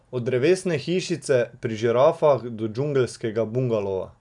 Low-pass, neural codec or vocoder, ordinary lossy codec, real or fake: 10.8 kHz; none; none; real